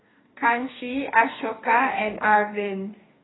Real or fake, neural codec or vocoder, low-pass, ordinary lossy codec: fake; codec, 44.1 kHz, 2.6 kbps, DAC; 7.2 kHz; AAC, 16 kbps